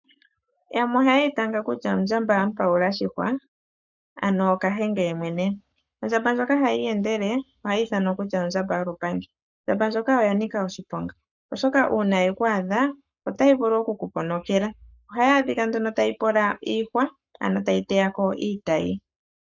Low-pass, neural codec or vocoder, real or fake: 7.2 kHz; codec, 44.1 kHz, 7.8 kbps, Pupu-Codec; fake